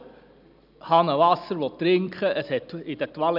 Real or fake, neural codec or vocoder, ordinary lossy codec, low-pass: real; none; none; 5.4 kHz